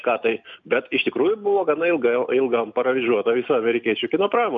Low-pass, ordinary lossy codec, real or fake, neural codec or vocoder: 7.2 kHz; MP3, 64 kbps; real; none